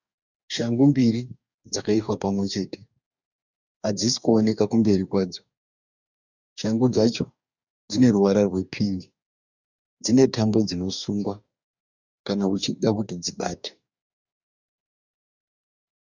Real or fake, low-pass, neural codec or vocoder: fake; 7.2 kHz; codec, 44.1 kHz, 2.6 kbps, DAC